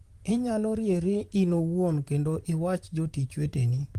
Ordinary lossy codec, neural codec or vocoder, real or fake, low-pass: Opus, 24 kbps; vocoder, 44.1 kHz, 128 mel bands, Pupu-Vocoder; fake; 14.4 kHz